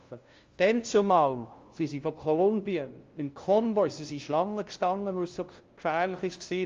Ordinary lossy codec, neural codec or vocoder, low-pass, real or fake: Opus, 32 kbps; codec, 16 kHz, 0.5 kbps, FunCodec, trained on LibriTTS, 25 frames a second; 7.2 kHz; fake